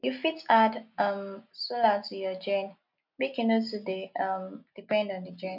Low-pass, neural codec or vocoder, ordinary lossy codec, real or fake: 5.4 kHz; none; none; real